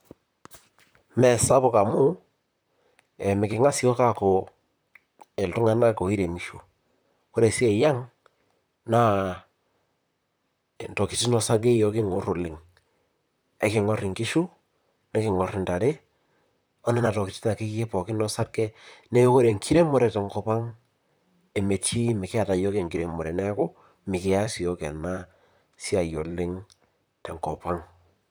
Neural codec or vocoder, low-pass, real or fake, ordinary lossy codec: vocoder, 44.1 kHz, 128 mel bands, Pupu-Vocoder; none; fake; none